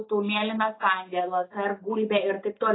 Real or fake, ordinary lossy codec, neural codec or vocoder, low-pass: real; AAC, 16 kbps; none; 7.2 kHz